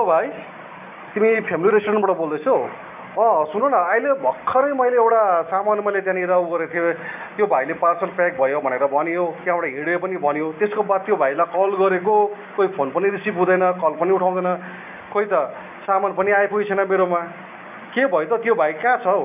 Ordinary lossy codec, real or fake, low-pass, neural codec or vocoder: none; real; 3.6 kHz; none